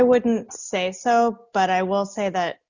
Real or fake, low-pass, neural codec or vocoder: real; 7.2 kHz; none